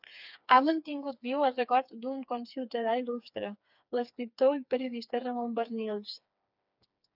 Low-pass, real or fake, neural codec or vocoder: 5.4 kHz; fake; codec, 16 kHz, 4 kbps, FreqCodec, smaller model